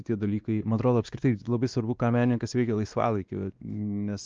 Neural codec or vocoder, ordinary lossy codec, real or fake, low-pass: codec, 16 kHz, 2 kbps, X-Codec, WavLM features, trained on Multilingual LibriSpeech; Opus, 24 kbps; fake; 7.2 kHz